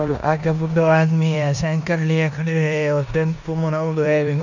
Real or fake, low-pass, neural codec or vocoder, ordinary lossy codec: fake; 7.2 kHz; codec, 16 kHz in and 24 kHz out, 0.9 kbps, LongCat-Audio-Codec, four codebook decoder; none